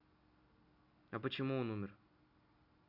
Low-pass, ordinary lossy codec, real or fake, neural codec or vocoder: 5.4 kHz; none; real; none